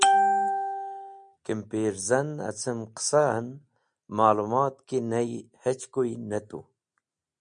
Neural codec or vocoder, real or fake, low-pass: none; real; 9.9 kHz